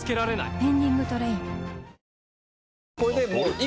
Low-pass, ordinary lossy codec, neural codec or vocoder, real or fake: none; none; none; real